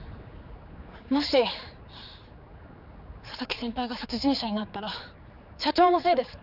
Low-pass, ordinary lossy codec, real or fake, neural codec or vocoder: 5.4 kHz; none; fake; codec, 16 kHz, 4 kbps, X-Codec, HuBERT features, trained on general audio